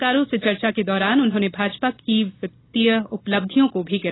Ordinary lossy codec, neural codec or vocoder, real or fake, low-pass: AAC, 16 kbps; none; real; 7.2 kHz